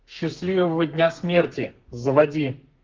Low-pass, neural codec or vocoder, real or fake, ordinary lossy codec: 7.2 kHz; codec, 32 kHz, 1.9 kbps, SNAC; fake; Opus, 24 kbps